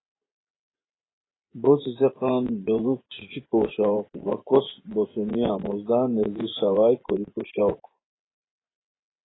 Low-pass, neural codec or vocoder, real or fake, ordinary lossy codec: 7.2 kHz; vocoder, 24 kHz, 100 mel bands, Vocos; fake; AAC, 16 kbps